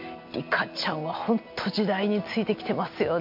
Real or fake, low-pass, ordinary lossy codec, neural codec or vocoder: real; 5.4 kHz; MP3, 48 kbps; none